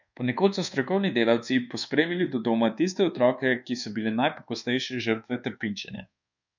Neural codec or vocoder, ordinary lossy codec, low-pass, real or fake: codec, 24 kHz, 1.2 kbps, DualCodec; none; 7.2 kHz; fake